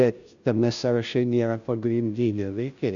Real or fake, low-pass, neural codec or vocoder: fake; 7.2 kHz; codec, 16 kHz, 0.5 kbps, FunCodec, trained on Chinese and English, 25 frames a second